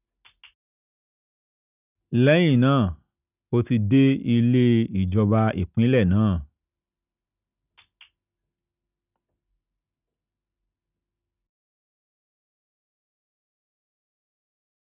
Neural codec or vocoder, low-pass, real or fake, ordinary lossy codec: none; 3.6 kHz; real; none